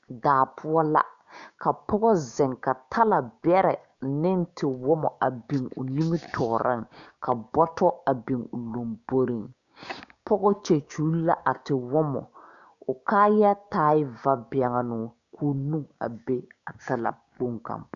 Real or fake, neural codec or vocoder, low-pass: fake; codec, 16 kHz, 6 kbps, DAC; 7.2 kHz